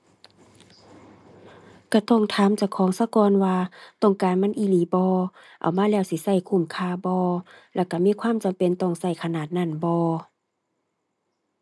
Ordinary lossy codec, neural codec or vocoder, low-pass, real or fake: none; none; none; real